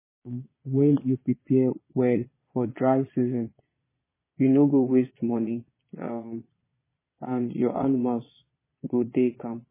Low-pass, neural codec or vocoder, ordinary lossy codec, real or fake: 3.6 kHz; vocoder, 22.05 kHz, 80 mel bands, Vocos; MP3, 16 kbps; fake